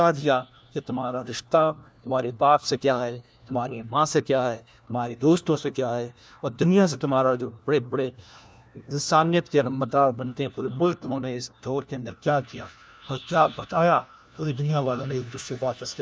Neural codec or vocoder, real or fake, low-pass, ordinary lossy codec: codec, 16 kHz, 1 kbps, FunCodec, trained on LibriTTS, 50 frames a second; fake; none; none